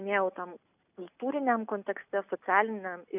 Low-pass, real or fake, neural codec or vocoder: 3.6 kHz; real; none